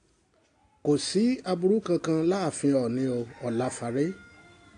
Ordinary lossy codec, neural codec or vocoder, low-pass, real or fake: AAC, 48 kbps; none; 9.9 kHz; real